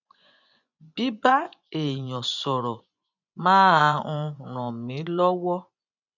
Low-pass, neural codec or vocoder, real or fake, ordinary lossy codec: 7.2 kHz; vocoder, 24 kHz, 100 mel bands, Vocos; fake; none